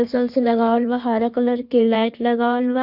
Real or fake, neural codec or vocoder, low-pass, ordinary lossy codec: fake; codec, 16 kHz in and 24 kHz out, 1.1 kbps, FireRedTTS-2 codec; 5.4 kHz; Opus, 64 kbps